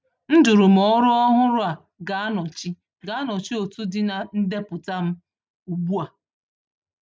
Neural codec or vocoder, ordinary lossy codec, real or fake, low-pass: none; none; real; none